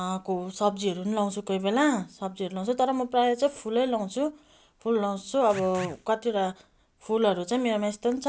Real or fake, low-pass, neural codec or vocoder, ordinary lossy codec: real; none; none; none